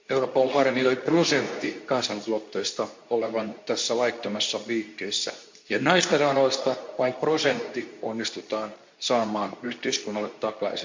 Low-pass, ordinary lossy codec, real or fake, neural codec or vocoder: 7.2 kHz; MP3, 48 kbps; fake; codec, 24 kHz, 0.9 kbps, WavTokenizer, medium speech release version 2